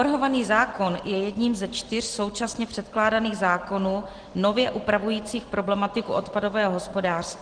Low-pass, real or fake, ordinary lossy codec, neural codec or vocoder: 9.9 kHz; real; Opus, 16 kbps; none